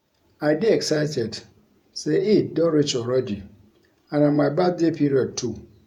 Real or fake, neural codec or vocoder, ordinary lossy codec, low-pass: fake; vocoder, 48 kHz, 128 mel bands, Vocos; none; 19.8 kHz